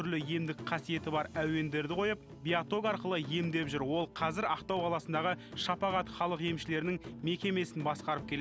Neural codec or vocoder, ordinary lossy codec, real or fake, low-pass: none; none; real; none